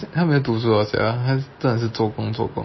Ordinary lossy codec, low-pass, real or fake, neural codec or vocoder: MP3, 24 kbps; 7.2 kHz; real; none